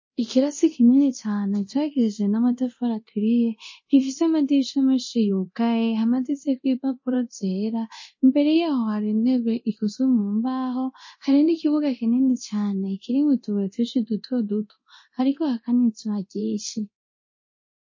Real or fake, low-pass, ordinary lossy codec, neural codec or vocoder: fake; 7.2 kHz; MP3, 32 kbps; codec, 24 kHz, 0.9 kbps, DualCodec